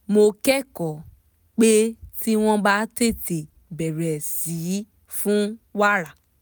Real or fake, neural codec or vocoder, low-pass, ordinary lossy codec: real; none; none; none